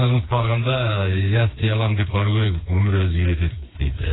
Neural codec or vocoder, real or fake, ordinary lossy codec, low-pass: codec, 16 kHz, 4 kbps, FreqCodec, smaller model; fake; AAC, 16 kbps; 7.2 kHz